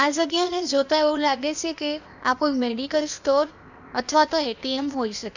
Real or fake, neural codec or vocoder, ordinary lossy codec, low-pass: fake; codec, 16 kHz, 0.8 kbps, ZipCodec; none; 7.2 kHz